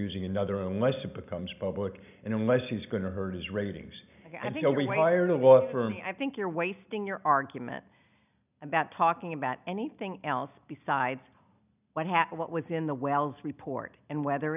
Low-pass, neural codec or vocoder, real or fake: 3.6 kHz; none; real